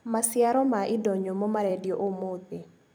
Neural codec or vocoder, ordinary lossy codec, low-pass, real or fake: vocoder, 44.1 kHz, 128 mel bands every 256 samples, BigVGAN v2; none; none; fake